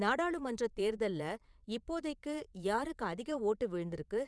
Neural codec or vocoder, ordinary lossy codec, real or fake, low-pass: vocoder, 22.05 kHz, 80 mel bands, Vocos; none; fake; none